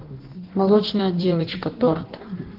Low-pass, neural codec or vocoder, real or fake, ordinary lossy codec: 5.4 kHz; codec, 16 kHz in and 24 kHz out, 1.1 kbps, FireRedTTS-2 codec; fake; Opus, 16 kbps